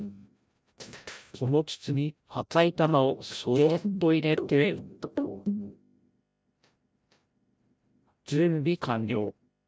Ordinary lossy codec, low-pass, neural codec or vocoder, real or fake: none; none; codec, 16 kHz, 0.5 kbps, FreqCodec, larger model; fake